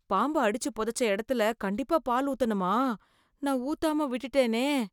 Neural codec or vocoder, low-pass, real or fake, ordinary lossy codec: none; 19.8 kHz; real; none